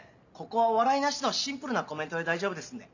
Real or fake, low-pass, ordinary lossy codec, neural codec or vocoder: real; 7.2 kHz; none; none